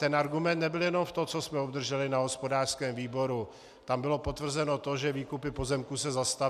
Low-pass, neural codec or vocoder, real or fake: 14.4 kHz; none; real